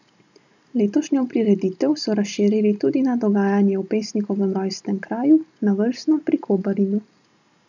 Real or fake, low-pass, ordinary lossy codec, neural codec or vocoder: real; 7.2 kHz; none; none